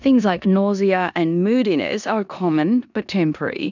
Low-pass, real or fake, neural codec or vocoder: 7.2 kHz; fake; codec, 16 kHz in and 24 kHz out, 0.9 kbps, LongCat-Audio-Codec, four codebook decoder